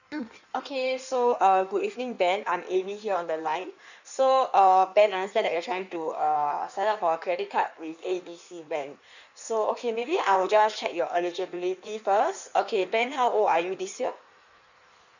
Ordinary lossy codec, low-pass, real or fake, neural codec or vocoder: none; 7.2 kHz; fake; codec, 16 kHz in and 24 kHz out, 1.1 kbps, FireRedTTS-2 codec